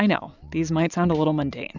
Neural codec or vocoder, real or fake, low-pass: none; real; 7.2 kHz